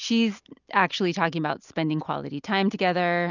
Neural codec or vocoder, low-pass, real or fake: none; 7.2 kHz; real